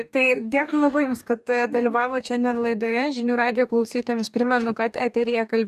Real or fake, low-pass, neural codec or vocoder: fake; 14.4 kHz; codec, 44.1 kHz, 2.6 kbps, DAC